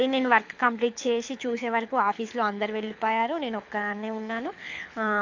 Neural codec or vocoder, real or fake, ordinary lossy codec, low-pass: codec, 24 kHz, 6 kbps, HILCodec; fake; AAC, 48 kbps; 7.2 kHz